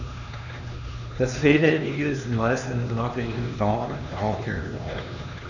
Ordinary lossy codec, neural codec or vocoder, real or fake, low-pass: none; codec, 16 kHz, 2 kbps, X-Codec, HuBERT features, trained on LibriSpeech; fake; 7.2 kHz